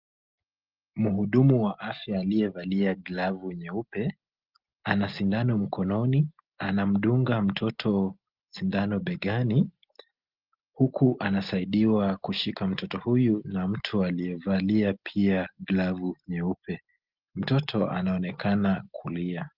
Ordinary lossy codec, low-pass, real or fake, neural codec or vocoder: Opus, 32 kbps; 5.4 kHz; real; none